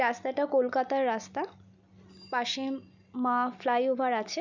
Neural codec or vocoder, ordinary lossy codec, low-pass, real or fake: none; none; 7.2 kHz; real